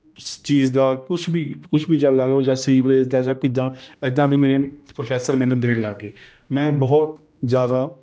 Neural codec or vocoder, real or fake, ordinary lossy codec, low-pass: codec, 16 kHz, 1 kbps, X-Codec, HuBERT features, trained on general audio; fake; none; none